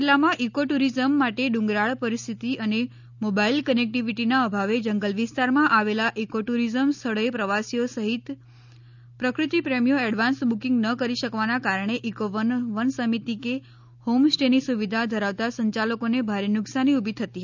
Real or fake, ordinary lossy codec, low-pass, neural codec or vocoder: real; none; 7.2 kHz; none